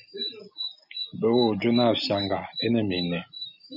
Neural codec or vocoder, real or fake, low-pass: none; real; 5.4 kHz